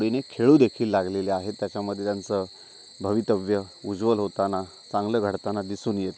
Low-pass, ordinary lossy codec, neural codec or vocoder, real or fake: none; none; none; real